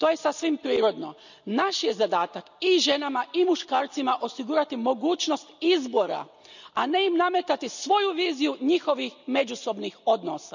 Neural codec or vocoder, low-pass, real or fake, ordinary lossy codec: none; 7.2 kHz; real; none